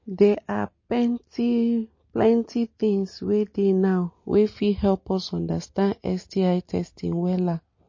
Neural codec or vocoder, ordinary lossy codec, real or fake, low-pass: none; MP3, 32 kbps; real; 7.2 kHz